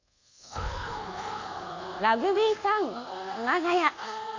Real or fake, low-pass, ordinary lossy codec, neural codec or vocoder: fake; 7.2 kHz; AAC, 48 kbps; codec, 24 kHz, 1.2 kbps, DualCodec